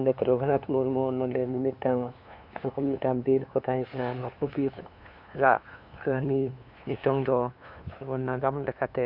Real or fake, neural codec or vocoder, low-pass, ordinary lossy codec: fake; codec, 16 kHz, 2 kbps, FunCodec, trained on LibriTTS, 25 frames a second; 5.4 kHz; none